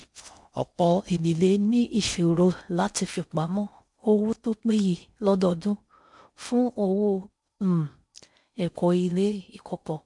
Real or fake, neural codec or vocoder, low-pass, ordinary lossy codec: fake; codec, 16 kHz in and 24 kHz out, 0.6 kbps, FocalCodec, streaming, 4096 codes; 10.8 kHz; MP3, 64 kbps